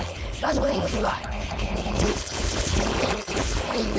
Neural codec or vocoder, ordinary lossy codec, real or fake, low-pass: codec, 16 kHz, 4.8 kbps, FACodec; none; fake; none